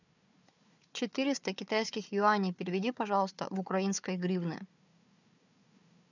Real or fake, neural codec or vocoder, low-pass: fake; codec, 16 kHz, 4 kbps, FunCodec, trained on Chinese and English, 50 frames a second; 7.2 kHz